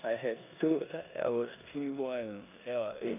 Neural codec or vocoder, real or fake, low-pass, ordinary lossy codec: codec, 16 kHz in and 24 kHz out, 0.9 kbps, LongCat-Audio-Codec, four codebook decoder; fake; 3.6 kHz; none